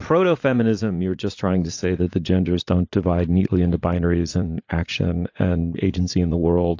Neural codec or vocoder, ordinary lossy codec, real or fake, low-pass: vocoder, 44.1 kHz, 80 mel bands, Vocos; AAC, 48 kbps; fake; 7.2 kHz